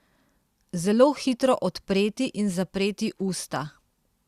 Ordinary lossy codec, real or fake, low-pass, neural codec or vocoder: Opus, 64 kbps; real; 14.4 kHz; none